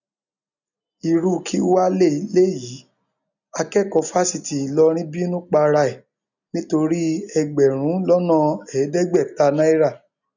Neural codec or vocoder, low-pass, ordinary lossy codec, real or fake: none; 7.2 kHz; none; real